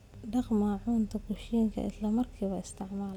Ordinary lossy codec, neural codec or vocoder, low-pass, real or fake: none; none; 19.8 kHz; real